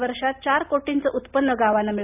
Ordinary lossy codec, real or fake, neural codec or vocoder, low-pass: none; real; none; 3.6 kHz